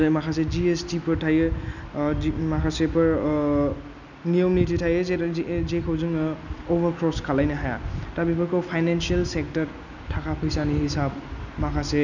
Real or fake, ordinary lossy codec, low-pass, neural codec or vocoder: real; none; 7.2 kHz; none